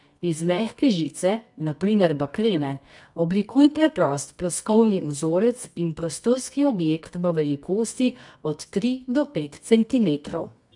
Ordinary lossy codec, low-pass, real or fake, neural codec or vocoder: none; 10.8 kHz; fake; codec, 24 kHz, 0.9 kbps, WavTokenizer, medium music audio release